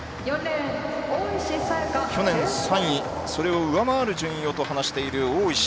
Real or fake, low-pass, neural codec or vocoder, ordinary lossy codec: real; none; none; none